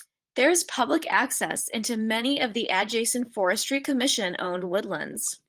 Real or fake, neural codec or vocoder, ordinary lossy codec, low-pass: fake; vocoder, 44.1 kHz, 128 mel bands, Pupu-Vocoder; Opus, 24 kbps; 14.4 kHz